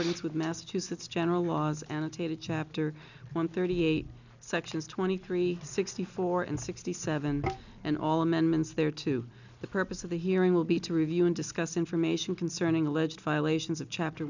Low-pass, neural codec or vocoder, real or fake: 7.2 kHz; none; real